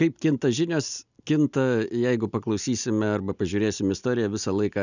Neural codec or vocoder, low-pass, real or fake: none; 7.2 kHz; real